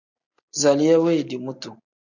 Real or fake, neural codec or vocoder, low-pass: real; none; 7.2 kHz